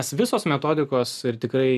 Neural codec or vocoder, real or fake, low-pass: none; real; 14.4 kHz